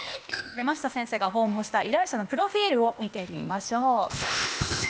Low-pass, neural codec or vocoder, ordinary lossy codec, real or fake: none; codec, 16 kHz, 0.8 kbps, ZipCodec; none; fake